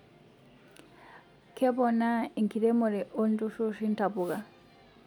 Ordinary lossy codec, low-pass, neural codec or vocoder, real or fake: none; 19.8 kHz; none; real